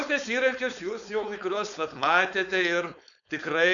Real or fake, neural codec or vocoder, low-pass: fake; codec, 16 kHz, 4.8 kbps, FACodec; 7.2 kHz